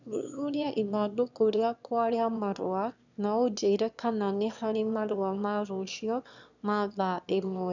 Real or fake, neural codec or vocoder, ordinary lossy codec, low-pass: fake; autoencoder, 22.05 kHz, a latent of 192 numbers a frame, VITS, trained on one speaker; none; 7.2 kHz